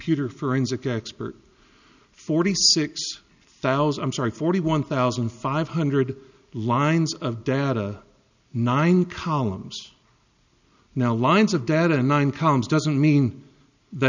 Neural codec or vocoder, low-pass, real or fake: none; 7.2 kHz; real